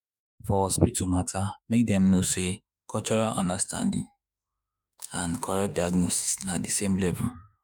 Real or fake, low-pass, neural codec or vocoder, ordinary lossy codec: fake; none; autoencoder, 48 kHz, 32 numbers a frame, DAC-VAE, trained on Japanese speech; none